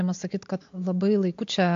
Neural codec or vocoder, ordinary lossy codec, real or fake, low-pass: none; AAC, 48 kbps; real; 7.2 kHz